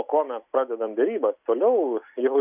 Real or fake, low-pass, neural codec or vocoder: real; 3.6 kHz; none